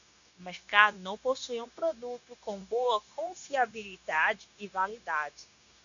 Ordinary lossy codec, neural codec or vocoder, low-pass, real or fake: MP3, 96 kbps; codec, 16 kHz, 0.9 kbps, LongCat-Audio-Codec; 7.2 kHz; fake